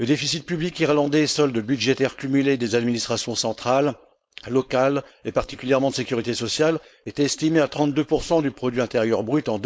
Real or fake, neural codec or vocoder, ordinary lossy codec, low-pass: fake; codec, 16 kHz, 4.8 kbps, FACodec; none; none